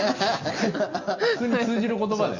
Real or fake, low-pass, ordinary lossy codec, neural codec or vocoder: real; 7.2 kHz; Opus, 64 kbps; none